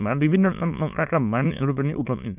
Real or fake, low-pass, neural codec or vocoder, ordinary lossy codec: fake; 3.6 kHz; autoencoder, 22.05 kHz, a latent of 192 numbers a frame, VITS, trained on many speakers; none